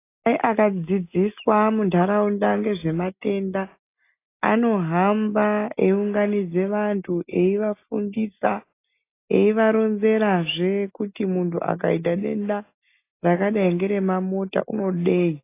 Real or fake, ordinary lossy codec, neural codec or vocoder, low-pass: real; AAC, 24 kbps; none; 3.6 kHz